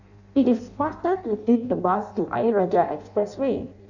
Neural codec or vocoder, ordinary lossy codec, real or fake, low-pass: codec, 16 kHz in and 24 kHz out, 0.6 kbps, FireRedTTS-2 codec; none; fake; 7.2 kHz